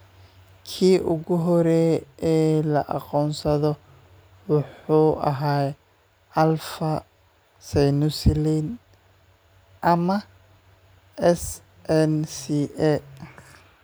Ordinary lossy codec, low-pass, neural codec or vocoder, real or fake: none; none; none; real